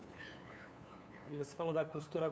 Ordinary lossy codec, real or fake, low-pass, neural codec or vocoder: none; fake; none; codec, 16 kHz, 2 kbps, FunCodec, trained on LibriTTS, 25 frames a second